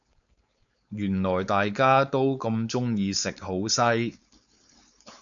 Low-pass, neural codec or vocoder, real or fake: 7.2 kHz; codec, 16 kHz, 4.8 kbps, FACodec; fake